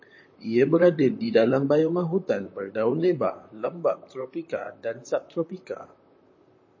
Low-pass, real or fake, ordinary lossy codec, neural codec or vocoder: 7.2 kHz; fake; MP3, 32 kbps; vocoder, 44.1 kHz, 128 mel bands, Pupu-Vocoder